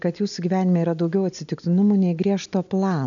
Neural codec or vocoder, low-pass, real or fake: none; 7.2 kHz; real